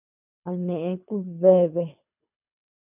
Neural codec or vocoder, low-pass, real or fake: codec, 24 kHz, 3 kbps, HILCodec; 3.6 kHz; fake